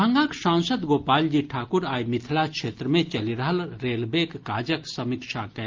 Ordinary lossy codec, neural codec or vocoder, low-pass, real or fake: Opus, 32 kbps; none; 7.2 kHz; real